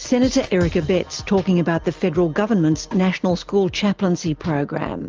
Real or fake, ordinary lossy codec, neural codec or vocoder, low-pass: real; Opus, 24 kbps; none; 7.2 kHz